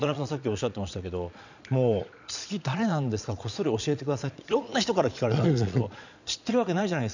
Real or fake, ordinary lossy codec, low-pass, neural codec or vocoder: fake; none; 7.2 kHz; vocoder, 22.05 kHz, 80 mel bands, Vocos